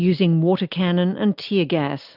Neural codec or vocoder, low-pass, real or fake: none; 5.4 kHz; real